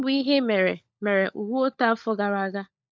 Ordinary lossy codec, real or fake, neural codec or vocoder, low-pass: none; fake; codec, 16 kHz, 16 kbps, FunCodec, trained on LibriTTS, 50 frames a second; none